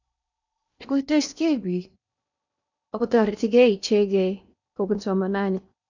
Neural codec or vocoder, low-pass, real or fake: codec, 16 kHz in and 24 kHz out, 0.8 kbps, FocalCodec, streaming, 65536 codes; 7.2 kHz; fake